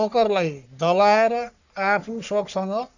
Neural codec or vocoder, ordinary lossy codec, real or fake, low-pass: codec, 44.1 kHz, 3.4 kbps, Pupu-Codec; none; fake; 7.2 kHz